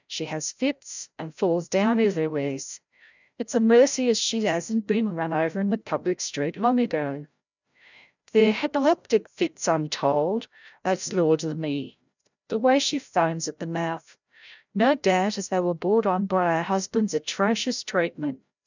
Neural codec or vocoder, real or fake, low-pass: codec, 16 kHz, 0.5 kbps, FreqCodec, larger model; fake; 7.2 kHz